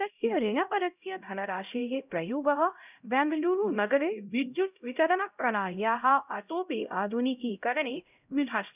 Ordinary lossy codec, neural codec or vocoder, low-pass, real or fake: none; codec, 16 kHz, 0.5 kbps, X-Codec, HuBERT features, trained on LibriSpeech; 3.6 kHz; fake